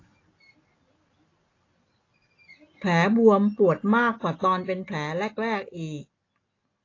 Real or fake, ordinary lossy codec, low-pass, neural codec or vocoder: real; AAC, 32 kbps; 7.2 kHz; none